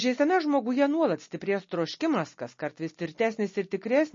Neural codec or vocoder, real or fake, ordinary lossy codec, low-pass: none; real; MP3, 32 kbps; 7.2 kHz